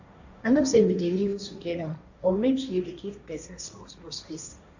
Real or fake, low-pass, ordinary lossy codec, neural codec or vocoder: fake; 7.2 kHz; none; codec, 16 kHz, 1.1 kbps, Voila-Tokenizer